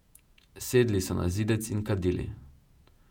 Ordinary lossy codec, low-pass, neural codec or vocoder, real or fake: none; 19.8 kHz; vocoder, 48 kHz, 128 mel bands, Vocos; fake